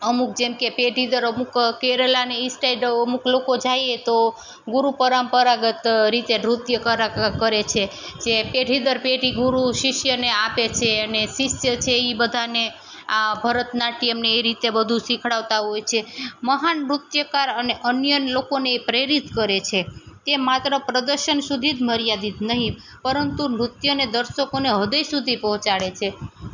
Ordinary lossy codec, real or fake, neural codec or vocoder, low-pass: none; real; none; 7.2 kHz